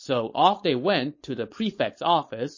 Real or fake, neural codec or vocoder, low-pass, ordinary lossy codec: fake; codec, 16 kHz, 4.8 kbps, FACodec; 7.2 kHz; MP3, 32 kbps